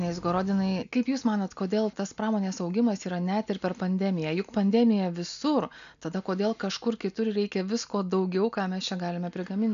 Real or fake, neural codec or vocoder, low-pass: real; none; 7.2 kHz